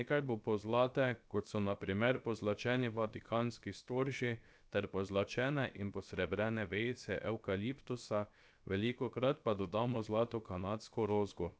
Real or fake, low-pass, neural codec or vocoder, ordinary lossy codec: fake; none; codec, 16 kHz, 0.7 kbps, FocalCodec; none